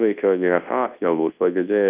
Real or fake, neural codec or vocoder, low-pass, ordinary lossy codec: fake; codec, 24 kHz, 0.9 kbps, WavTokenizer, large speech release; 3.6 kHz; Opus, 24 kbps